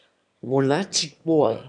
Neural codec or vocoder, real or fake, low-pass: autoencoder, 22.05 kHz, a latent of 192 numbers a frame, VITS, trained on one speaker; fake; 9.9 kHz